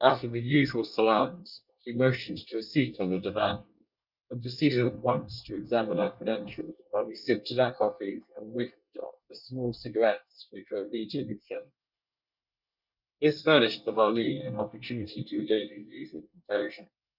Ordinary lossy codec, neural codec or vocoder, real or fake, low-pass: Opus, 64 kbps; codec, 24 kHz, 1 kbps, SNAC; fake; 5.4 kHz